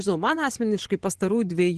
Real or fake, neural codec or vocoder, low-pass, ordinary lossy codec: fake; vocoder, 24 kHz, 100 mel bands, Vocos; 10.8 kHz; Opus, 16 kbps